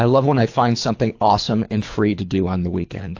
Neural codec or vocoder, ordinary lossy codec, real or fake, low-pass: codec, 24 kHz, 3 kbps, HILCodec; AAC, 48 kbps; fake; 7.2 kHz